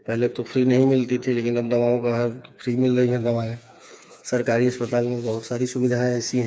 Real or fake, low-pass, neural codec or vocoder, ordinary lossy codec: fake; none; codec, 16 kHz, 4 kbps, FreqCodec, smaller model; none